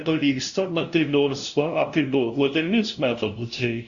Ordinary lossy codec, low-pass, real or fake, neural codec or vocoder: Opus, 64 kbps; 7.2 kHz; fake; codec, 16 kHz, 0.5 kbps, FunCodec, trained on LibriTTS, 25 frames a second